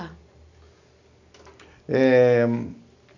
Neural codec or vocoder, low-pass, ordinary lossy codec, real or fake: none; 7.2 kHz; none; real